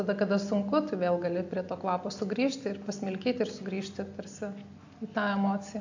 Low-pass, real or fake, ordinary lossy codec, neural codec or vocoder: 7.2 kHz; real; AAC, 48 kbps; none